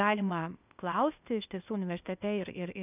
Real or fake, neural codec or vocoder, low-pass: fake; codec, 16 kHz, 0.8 kbps, ZipCodec; 3.6 kHz